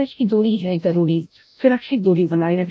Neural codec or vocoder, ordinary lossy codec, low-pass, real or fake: codec, 16 kHz, 0.5 kbps, FreqCodec, larger model; none; none; fake